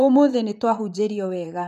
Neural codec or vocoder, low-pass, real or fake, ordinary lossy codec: vocoder, 44.1 kHz, 128 mel bands, Pupu-Vocoder; 14.4 kHz; fake; none